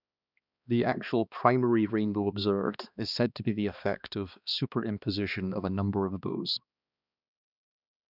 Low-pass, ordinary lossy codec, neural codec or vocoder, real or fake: 5.4 kHz; none; codec, 16 kHz, 2 kbps, X-Codec, HuBERT features, trained on balanced general audio; fake